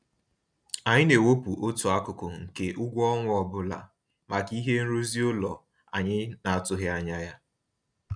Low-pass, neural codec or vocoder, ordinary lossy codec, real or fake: 9.9 kHz; none; none; real